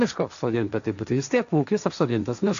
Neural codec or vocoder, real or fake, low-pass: codec, 16 kHz, 1.1 kbps, Voila-Tokenizer; fake; 7.2 kHz